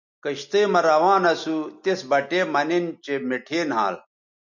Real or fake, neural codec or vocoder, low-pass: real; none; 7.2 kHz